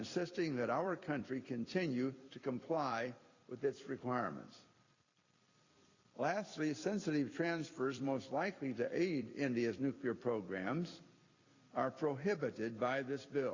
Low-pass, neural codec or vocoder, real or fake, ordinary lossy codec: 7.2 kHz; vocoder, 44.1 kHz, 128 mel bands every 256 samples, BigVGAN v2; fake; Opus, 64 kbps